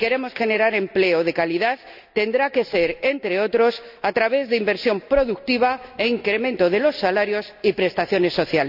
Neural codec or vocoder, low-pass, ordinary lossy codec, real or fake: none; 5.4 kHz; none; real